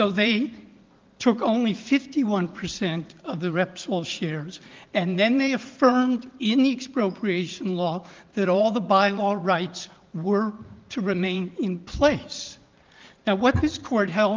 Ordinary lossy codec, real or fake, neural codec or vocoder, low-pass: Opus, 32 kbps; fake; vocoder, 44.1 kHz, 80 mel bands, Vocos; 7.2 kHz